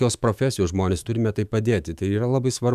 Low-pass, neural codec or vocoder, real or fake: 14.4 kHz; autoencoder, 48 kHz, 128 numbers a frame, DAC-VAE, trained on Japanese speech; fake